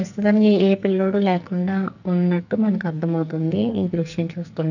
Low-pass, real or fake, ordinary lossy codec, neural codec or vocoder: 7.2 kHz; fake; none; codec, 44.1 kHz, 2.6 kbps, SNAC